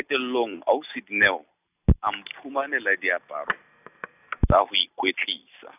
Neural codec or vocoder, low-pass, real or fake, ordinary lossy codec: none; 3.6 kHz; real; none